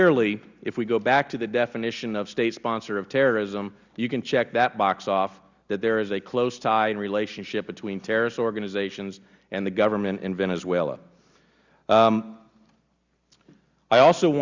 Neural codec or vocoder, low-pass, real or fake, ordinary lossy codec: none; 7.2 kHz; real; Opus, 64 kbps